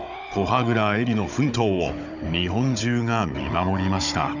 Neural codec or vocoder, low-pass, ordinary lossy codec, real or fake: codec, 16 kHz, 16 kbps, FunCodec, trained on Chinese and English, 50 frames a second; 7.2 kHz; none; fake